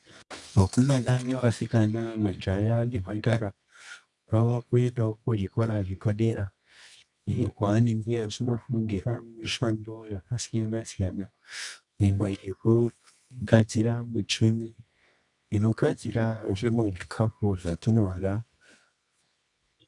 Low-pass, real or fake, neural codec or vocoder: 10.8 kHz; fake; codec, 24 kHz, 0.9 kbps, WavTokenizer, medium music audio release